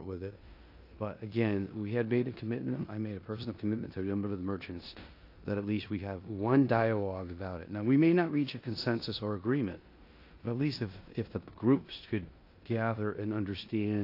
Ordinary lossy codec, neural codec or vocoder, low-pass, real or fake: AAC, 32 kbps; codec, 16 kHz in and 24 kHz out, 0.9 kbps, LongCat-Audio-Codec, four codebook decoder; 5.4 kHz; fake